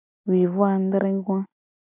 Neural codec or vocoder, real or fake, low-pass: none; real; 3.6 kHz